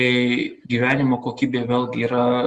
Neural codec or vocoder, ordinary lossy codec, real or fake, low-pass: none; Opus, 32 kbps; real; 10.8 kHz